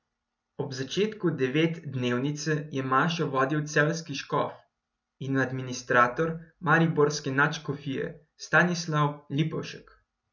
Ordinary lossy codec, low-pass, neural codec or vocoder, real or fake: none; 7.2 kHz; none; real